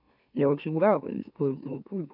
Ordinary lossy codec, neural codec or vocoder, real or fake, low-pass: none; autoencoder, 44.1 kHz, a latent of 192 numbers a frame, MeloTTS; fake; 5.4 kHz